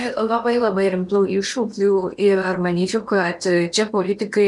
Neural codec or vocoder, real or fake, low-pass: codec, 16 kHz in and 24 kHz out, 0.8 kbps, FocalCodec, streaming, 65536 codes; fake; 10.8 kHz